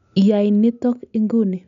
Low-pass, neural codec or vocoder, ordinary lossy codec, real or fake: 7.2 kHz; none; none; real